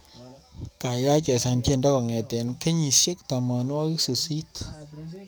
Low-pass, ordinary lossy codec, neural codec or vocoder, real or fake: none; none; codec, 44.1 kHz, 7.8 kbps, DAC; fake